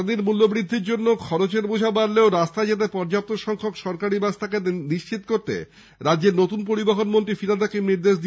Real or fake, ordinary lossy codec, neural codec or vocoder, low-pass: real; none; none; none